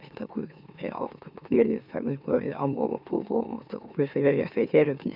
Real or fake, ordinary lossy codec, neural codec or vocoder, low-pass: fake; none; autoencoder, 44.1 kHz, a latent of 192 numbers a frame, MeloTTS; 5.4 kHz